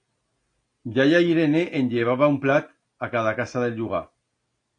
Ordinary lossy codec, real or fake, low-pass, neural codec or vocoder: AAC, 48 kbps; real; 9.9 kHz; none